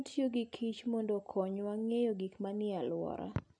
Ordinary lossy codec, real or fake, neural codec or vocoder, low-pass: none; real; none; 9.9 kHz